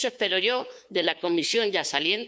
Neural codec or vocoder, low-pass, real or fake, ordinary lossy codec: codec, 16 kHz, 2 kbps, FunCodec, trained on LibriTTS, 25 frames a second; none; fake; none